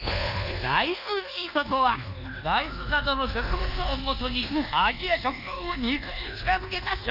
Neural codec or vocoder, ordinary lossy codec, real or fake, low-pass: codec, 24 kHz, 1.2 kbps, DualCodec; none; fake; 5.4 kHz